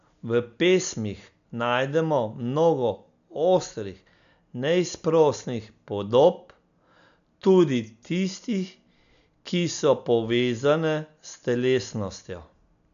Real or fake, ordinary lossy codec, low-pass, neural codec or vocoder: real; none; 7.2 kHz; none